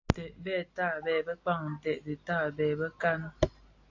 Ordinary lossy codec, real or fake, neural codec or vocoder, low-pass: AAC, 48 kbps; real; none; 7.2 kHz